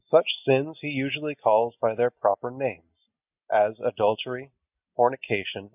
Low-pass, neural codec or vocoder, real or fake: 3.6 kHz; none; real